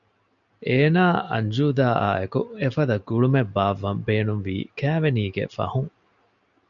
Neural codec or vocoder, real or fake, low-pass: none; real; 7.2 kHz